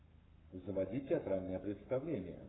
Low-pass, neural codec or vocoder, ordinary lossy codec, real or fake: 7.2 kHz; codec, 44.1 kHz, 7.8 kbps, Pupu-Codec; AAC, 16 kbps; fake